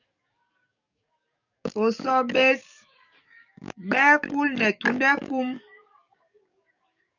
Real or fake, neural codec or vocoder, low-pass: fake; codec, 44.1 kHz, 7.8 kbps, DAC; 7.2 kHz